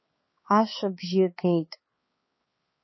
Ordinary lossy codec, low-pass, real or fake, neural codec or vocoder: MP3, 24 kbps; 7.2 kHz; fake; codec, 16 kHz, 4 kbps, X-Codec, HuBERT features, trained on balanced general audio